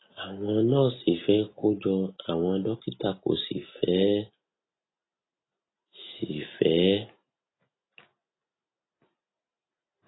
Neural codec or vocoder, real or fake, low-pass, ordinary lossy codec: none; real; 7.2 kHz; AAC, 16 kbps